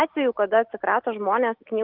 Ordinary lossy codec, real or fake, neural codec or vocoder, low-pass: Opus, 24 kbps; real; none; 5.4 kHz